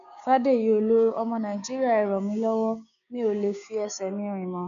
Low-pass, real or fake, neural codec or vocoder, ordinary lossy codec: 7.2 kHz; fake; codec, 16 kHz, 6 kbps, DAC; none